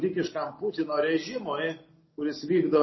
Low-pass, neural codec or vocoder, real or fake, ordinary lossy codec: 7.2 kHz; none; real; MP3, 24 kbps